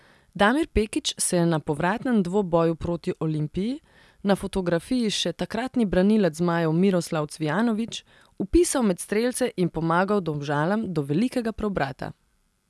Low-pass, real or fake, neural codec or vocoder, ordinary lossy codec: none; real; none; none